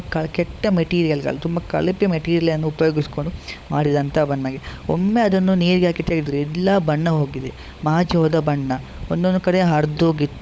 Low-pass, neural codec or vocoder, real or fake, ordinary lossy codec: none; codec, 16 kHz, 16 kbps, FunCodec, trained on LibriTTS, 50 frames a second; fake; none